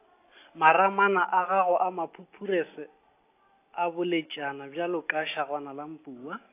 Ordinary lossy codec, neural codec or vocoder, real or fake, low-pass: AAC, 24 kbps; none; real; 3.6 kHz